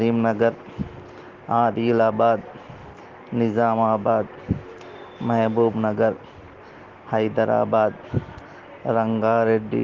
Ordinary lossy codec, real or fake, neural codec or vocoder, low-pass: Opus, 16 kbps; real; none; 7.2 kHz